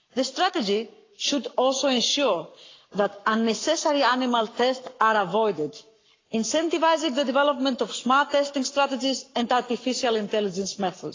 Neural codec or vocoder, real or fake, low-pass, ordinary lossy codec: codec, 44.1 kHz, 7.8 kbps, Pupu-Codec; fake; 7.2 kHz; AAC, 32 kbps